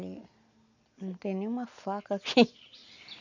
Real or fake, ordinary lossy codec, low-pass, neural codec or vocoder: real; none; 7.2 kHz; none